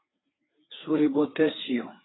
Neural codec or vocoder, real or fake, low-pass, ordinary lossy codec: codec, 16 kHz, 4 kbps, FreqCodec, larger model; fake; 7.2 kHz; AAC, 16 kbps